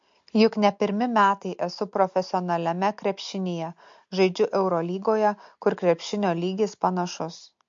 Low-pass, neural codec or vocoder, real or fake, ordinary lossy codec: 7.2 kHz; none; real; MP3, 48 kbps